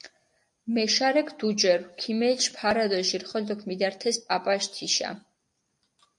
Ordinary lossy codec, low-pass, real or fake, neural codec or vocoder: AAC, 64 kbps; 10.8 kHz; real; none